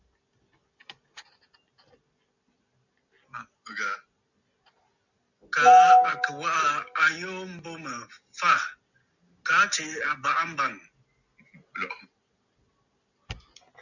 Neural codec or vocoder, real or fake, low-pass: none; real; 7.2 kHz